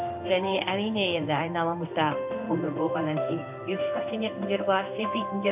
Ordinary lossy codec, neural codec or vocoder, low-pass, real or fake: AAC, 32 kbps; codec, 16 kHz in and 24 kHz out, 1 kbps, XY-Tokenizer; 3.6 kHz; fake